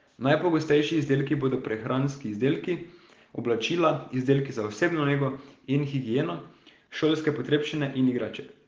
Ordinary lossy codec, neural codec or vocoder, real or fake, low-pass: Opus, 16 kbps; none; real; 7.2 kHz